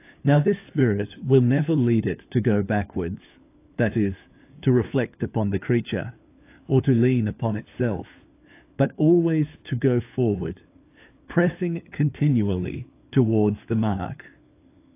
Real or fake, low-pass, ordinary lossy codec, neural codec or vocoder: fake; 3.6 kHz; AAC, 24 kbps; codec, 16 kHz, 4 kbps, FunCodec, trained on LibriTTS, 50 frames a second